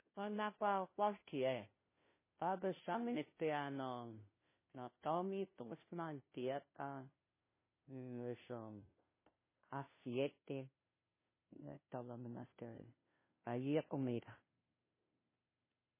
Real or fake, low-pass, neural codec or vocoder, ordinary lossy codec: fake; 3.6 kHz; codec, 16 kHz, 0.5 kbps, FunCodec, trained on Chinese and English, 25 frames a second; MP3, 16 kbps